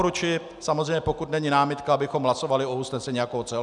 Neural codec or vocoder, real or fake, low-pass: none; real; 10.8 kHz